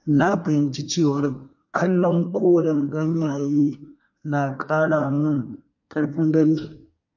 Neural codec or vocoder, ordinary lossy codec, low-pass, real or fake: codec, 24 kHz, 1 kbps, SNAC; MP3, 48 kbps; 7.2 kHz; fake